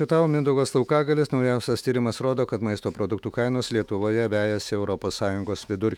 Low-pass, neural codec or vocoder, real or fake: 19.8 kHz; autoencoder, 48 kHz, 128 numbers a frame, DAC-VAE, trained on Japanese speech; fake